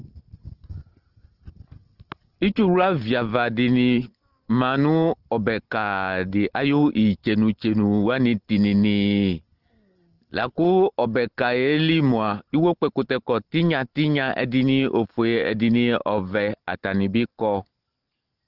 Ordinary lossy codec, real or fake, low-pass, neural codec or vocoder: Opus, 16 kbps; real; 5.4 kHz; none